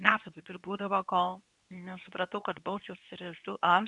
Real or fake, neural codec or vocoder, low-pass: fake; codec, 24 kHz, 0.9 kbps, WavTokenizer, medium speech release version 2; 10.8 kHz